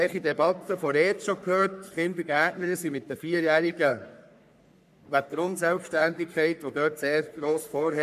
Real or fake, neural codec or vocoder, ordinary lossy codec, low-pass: fake; codec, 44.1 kHz, 3.4 kbps, Pupu-Codec; none; 14.4 kHz